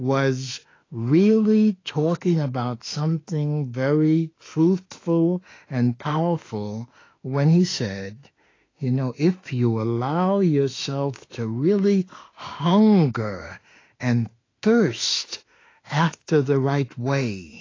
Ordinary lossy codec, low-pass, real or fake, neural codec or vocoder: AAC, 32 kbps; 7.2 kHz; fake; autoencoder, 48 kHz, 32 numbers a frame, DAC-VAE, trained on Japanese speech